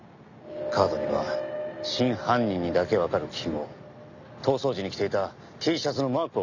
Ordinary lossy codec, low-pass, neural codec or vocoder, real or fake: none; 7.2 kHz; none; real